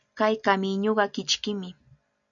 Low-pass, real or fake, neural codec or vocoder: 7.2 kHz; real; none